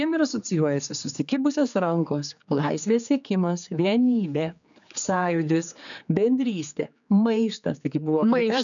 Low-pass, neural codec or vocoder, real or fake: 7.2 kHz; codec, 16 kHz, 4 kbps, X-Codec, HuBERT features, trained on general audio; fake